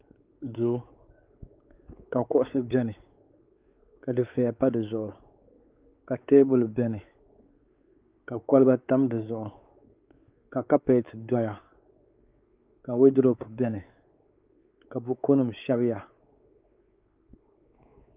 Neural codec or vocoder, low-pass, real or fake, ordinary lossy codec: codec, 16 kHz, 4 kbps, X-Codec, WavLM features, trained on Multilingual LibriSpeech; 3.6 kHz; fake; Opus, 24 kbps